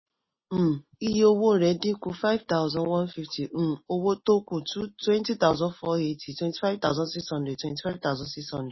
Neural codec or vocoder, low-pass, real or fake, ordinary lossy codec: none; 7.2 kHz; real; MP3, 24 kbps